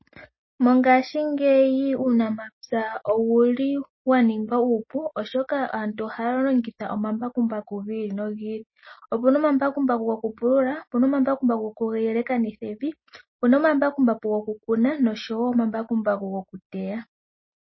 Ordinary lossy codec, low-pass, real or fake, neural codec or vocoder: MP3, 24 kbps; 7.2 kHz; real; none